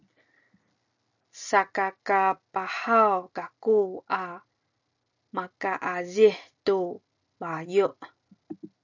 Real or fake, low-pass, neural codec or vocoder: real; 7.2 kHz; none